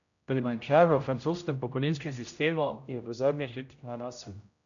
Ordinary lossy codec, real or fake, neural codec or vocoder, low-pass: none; fake; codec, 16 kHz, 0.5 kbps, X-Codec, HuBERT features, trained on general audio; 7.2 kHz